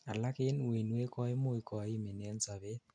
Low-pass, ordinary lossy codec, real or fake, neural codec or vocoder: 9.9 kHz; none; real; none